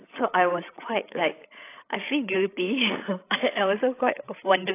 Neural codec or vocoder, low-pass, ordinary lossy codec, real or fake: codec, 16 kHz, 16 kbps, FreqCodec, larger model; 3.6 kHz; AAC, 24 kbps; fake